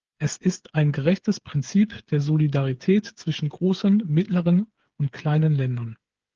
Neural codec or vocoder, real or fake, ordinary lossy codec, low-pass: codec, 16 kHz, 8 kbps, FreqCodec, smaller model; fake; Opus, 16 kbps; 7.2 kHz